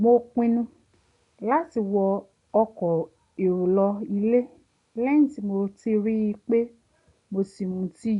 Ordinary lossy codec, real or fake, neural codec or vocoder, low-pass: none; real; none; 10.8 kHz